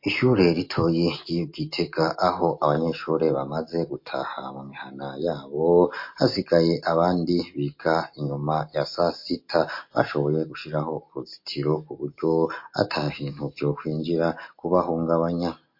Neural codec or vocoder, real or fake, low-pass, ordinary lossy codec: none; real; 5.4 kHz; MP3, 32 kbps